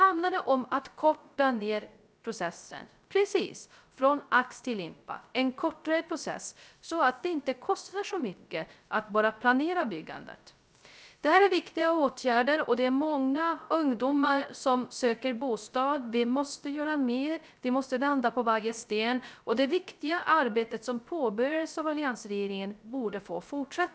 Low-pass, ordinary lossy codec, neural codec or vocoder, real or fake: none; none; codec, 16 kHz, 0.3 kbps, FocalCodec; fake